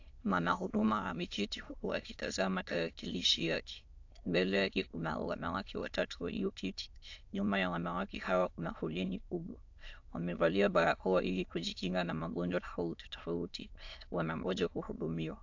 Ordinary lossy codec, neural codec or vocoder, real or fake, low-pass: AAC, 48 kbps; autoencoder, 22.05 kHz, a latent of 192 numbers a frame, VITS, trained on many speakers; fake; 7.2 kHz